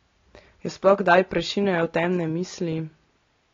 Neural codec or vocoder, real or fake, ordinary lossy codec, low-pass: none; real; AAC, 32 kbps; 7.2 kHz